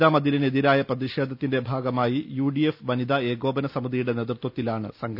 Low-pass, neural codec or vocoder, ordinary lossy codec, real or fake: 5.4 kHz; none; none; real